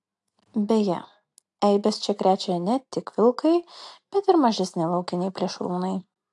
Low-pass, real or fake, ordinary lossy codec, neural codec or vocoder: 10.8 kHz; real; AAC, 64 kbps; none